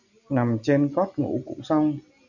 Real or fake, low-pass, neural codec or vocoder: real; 7.2 kHz; none